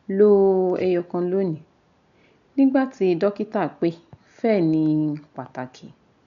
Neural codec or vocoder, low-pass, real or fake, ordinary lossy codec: none; 7.2 kHz; real; none